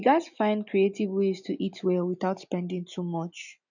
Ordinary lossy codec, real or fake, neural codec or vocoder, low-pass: none; real; none; 7.2 kHz